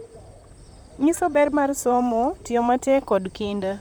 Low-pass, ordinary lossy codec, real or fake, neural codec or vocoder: none; none; fake; vocoder, 44.1 kHz, 128 mel bands, Pupu-Vocoder